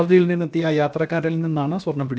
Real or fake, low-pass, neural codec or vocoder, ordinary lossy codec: fake; none; codec, 16 kHz, about 1 kbps, DyCAST, with the encoder's durations; none